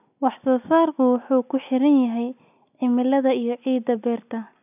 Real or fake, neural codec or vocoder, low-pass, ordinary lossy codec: real; none; 3.6 kHz; none